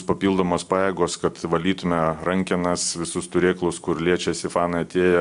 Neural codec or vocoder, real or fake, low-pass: none; real; 10.8 kHz